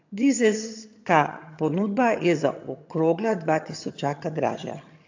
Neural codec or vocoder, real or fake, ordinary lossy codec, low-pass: vocoder, 22.05 kHz, 80 mel bands, HiFi-GAN; fake; AAC, 48 kbps; 7.2 kHz